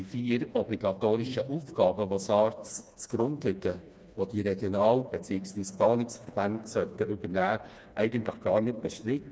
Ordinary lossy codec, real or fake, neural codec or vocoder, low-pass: none; fake; codec, 16 kHz, 2 kbps, FreqCodec, smaller model; none